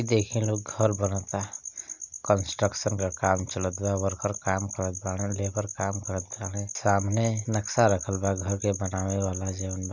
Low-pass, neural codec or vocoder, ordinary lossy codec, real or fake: 7.2 kHz; none; none; real